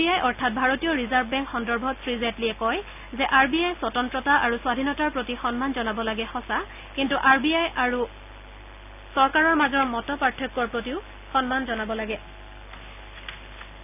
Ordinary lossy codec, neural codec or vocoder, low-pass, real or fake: none; none; 3.6 kHz; real